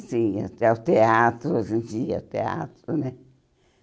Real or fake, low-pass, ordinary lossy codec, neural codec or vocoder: real; none; none; none